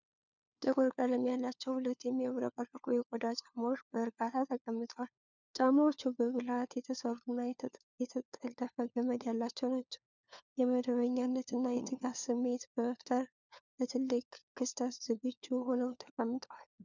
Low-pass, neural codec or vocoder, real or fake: 7.2 kHz; codec, 16 kHz, 4 kbps, FunCodec, trained on LibriTTS, 50 frames a second; fake